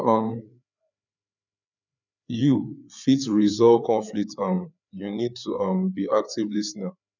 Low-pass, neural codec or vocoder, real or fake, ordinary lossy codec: 7.2 kHz; codec, 16 kHz, 4 kbps, FreqCodec, larger model; fake; none